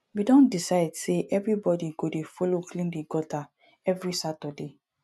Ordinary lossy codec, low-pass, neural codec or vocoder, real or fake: none; 14.4 kHz; none; real